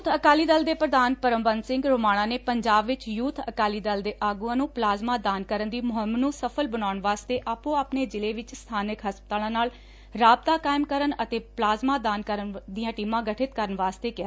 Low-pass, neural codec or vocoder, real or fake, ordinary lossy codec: none; none; real; none